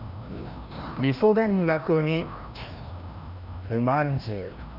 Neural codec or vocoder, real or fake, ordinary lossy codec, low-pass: codec, 16 kHz, 1 kbps, FreqCodec, larger model; fake; none; 5.4 kHz